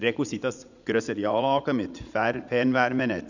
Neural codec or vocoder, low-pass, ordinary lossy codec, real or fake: vocoder, 44.1 kHz, 80 mel bands, Vocos; 7.2 kHz; none; fake